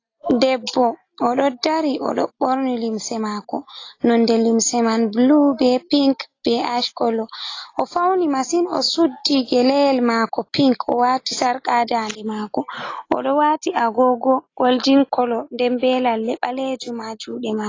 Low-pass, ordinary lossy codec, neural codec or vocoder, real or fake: 7.2 kHz; AAC, 32 kbps; none; real